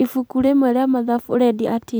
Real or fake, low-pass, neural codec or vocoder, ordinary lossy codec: fake; none; vocoder, 44.1 kHz, 128 mel bands every 256 samples, BigVGAN v2; none